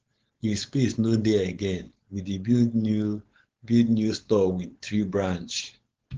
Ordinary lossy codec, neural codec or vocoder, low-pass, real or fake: Opus, 16 kbps; codec, 16 kHz, 4.8 kbps, FACodec; 7.2 kHz; fake